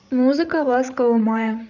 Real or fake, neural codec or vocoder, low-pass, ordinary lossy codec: fake; codec, 16 kHz, 16 kbps, FreqCodec, larger model; 7.2 kHz; none